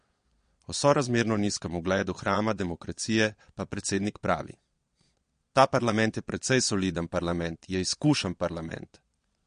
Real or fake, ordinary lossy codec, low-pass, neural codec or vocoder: fake; MP3, 48 kbps; 9.9 kHz; vocoder, 22.05 kHz, 80 mel bands, Vocos